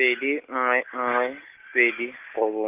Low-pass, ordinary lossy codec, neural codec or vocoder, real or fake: 3.6 kHz; none; none; real